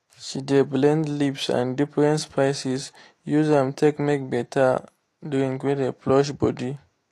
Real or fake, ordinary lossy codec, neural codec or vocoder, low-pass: fake; AAC, 48 kbps; autoencoder, 48 kHz, 128 numbers a frame, DAC-VAE, trained on Japanese speech; 14.4 kHz